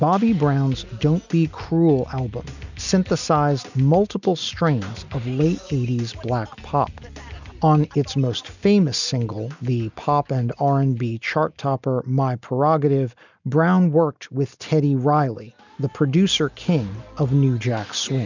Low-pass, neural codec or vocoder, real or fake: 7.2 kHz; none; real